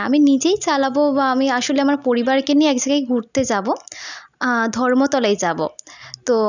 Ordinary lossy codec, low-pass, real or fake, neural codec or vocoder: none; 7.2 kHz; real; none